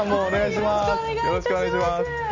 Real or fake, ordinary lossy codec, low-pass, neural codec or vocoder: real; none; 7.2 kHz; none